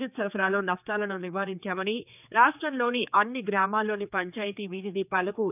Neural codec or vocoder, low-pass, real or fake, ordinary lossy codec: codec, 16 kHz, 4 kbps, X-Codec, HuBERT features, trained on general audio; 3.6 kHz; fake; none